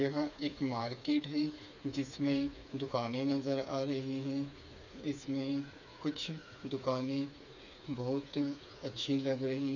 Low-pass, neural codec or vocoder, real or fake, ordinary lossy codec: 7.2 kHz; codec, 16 kHz, 4 kbps, FreqCodec, smaller model; fake; none